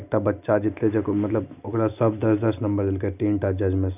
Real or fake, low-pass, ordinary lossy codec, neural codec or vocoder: real; 3.6 kHz; none; none